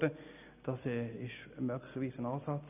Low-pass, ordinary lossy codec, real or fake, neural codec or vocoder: 3.6 kHz; none; real; none